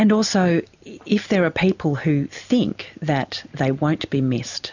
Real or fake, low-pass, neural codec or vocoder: real; 7.2 kHz; none